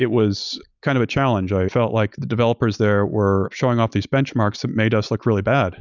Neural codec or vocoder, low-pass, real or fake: none; 7.2 kHz; real